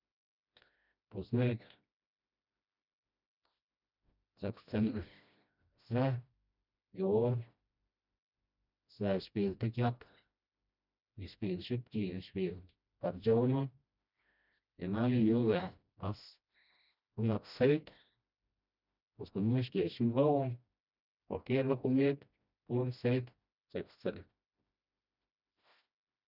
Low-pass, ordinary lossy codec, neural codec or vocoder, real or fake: 5.4 kHz; none; codec, 16 kHz, 1 kbps, FreqCodec, smaller model; fake